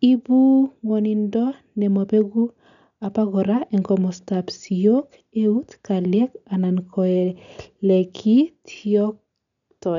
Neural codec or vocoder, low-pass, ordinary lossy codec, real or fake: none; 7.2 kHz; none; real